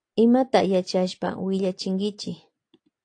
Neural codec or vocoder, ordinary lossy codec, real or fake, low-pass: none; AAC, 48 kbps; real; 9.9 kHz